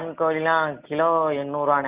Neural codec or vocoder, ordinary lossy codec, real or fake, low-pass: none; Opus, 64 kbps; real; 3.6 kHz